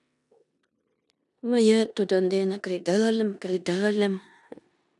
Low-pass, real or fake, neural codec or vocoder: 10.8 kHz; fake; codec, 16 kHz in and 24 kHz out, 0.9 kbps, LongCat-Audio-Codec, four codebook decoder